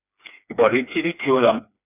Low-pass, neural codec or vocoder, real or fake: 3.6 kHz; codec, 16 kHz, 2 kbps, FreqCodec, smaller model; fake